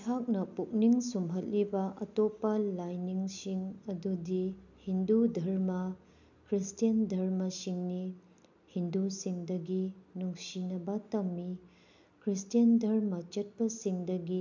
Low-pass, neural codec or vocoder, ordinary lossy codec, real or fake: 7.2 kHz; none; none; real